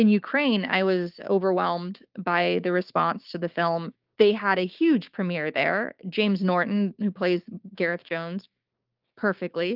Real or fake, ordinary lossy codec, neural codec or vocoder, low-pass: fake; Opus, 24 kbps; codec, 16 kHz, 6 kbps, DAC; 5.4 kHz